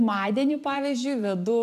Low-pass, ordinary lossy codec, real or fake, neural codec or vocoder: 14.4 kHz; AAC, 96 kbps; fake; vocoder, 44.1 kHz, 128 mel bands every 512 samples, BigVGAN v2